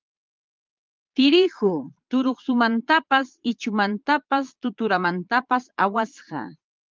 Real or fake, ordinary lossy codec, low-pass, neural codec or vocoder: fake; Opus, 24 kbps; 7.2 kHz; vocoder, 22.05 kHz, 80 mel bands, Vocos